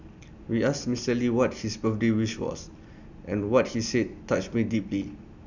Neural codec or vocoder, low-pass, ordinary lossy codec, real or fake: none; 7.2 kHz; none; real